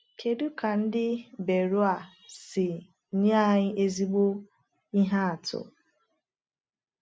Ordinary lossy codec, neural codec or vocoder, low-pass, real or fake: none; none; none; real